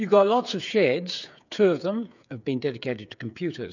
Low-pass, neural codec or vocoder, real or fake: 7.2 kHz; codec, 16 kHz, 8 kbps, FreqCodec, smaller model; fake